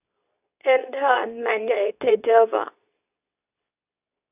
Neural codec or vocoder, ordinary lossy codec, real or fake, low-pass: codec, 24 kHz, 0.9 kbps, WavTokenizer, medium speech release version 2; none; fake; 3.6 kHz